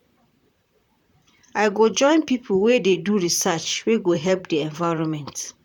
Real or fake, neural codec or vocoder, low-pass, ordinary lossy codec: fake; vocoder, 44.1 kHz, 128 mel bands, Pupu-Vocoder; 19.8 kHz; none